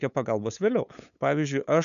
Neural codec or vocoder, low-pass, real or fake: codec, 16 kHz, 8 kbps, FunCodec, trained on LibriTTS, 25 frames a second; 7.2 kHz; fake